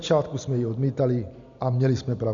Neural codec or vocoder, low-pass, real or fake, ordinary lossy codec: none; 7.2 kHz; real; AAC, 64 kbps